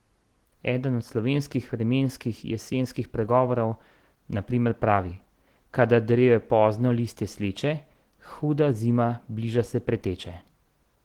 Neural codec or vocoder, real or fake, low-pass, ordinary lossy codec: none; real; 19.8 kHz; Opus, 16 kbps